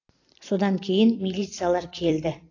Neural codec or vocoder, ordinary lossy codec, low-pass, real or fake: vocoder, 44.1 kHz, 128 mel bands every 256 samples, BigVGAN v2; AAC, 32 kbps; 7.2 kHz; fake